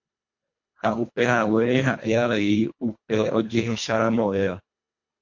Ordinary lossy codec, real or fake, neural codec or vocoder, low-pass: MP3, 48 kbps; fake; codec, 24 kHz, 1.5 kbps, HILCodec; 7.2 kHz